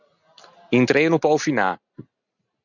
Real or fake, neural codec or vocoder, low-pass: real; none; 7.2 kHz